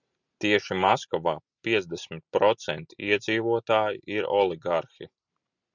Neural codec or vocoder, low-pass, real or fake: none; 7.2 kHz; real